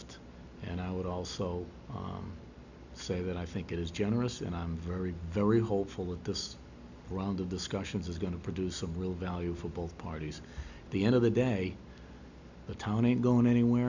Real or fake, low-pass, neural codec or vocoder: real; 7.2 kHz; none